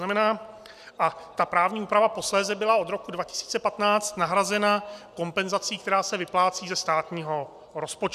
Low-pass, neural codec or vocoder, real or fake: 14.4 kHz; none; real